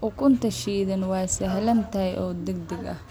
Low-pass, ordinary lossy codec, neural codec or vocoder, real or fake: none; none; none; real